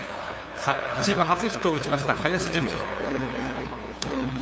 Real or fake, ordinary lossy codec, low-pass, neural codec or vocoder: fake; none; none; codec, 16 kHz, 2 kbps, FunCodec, trained on LibriTTS, 25 frames a second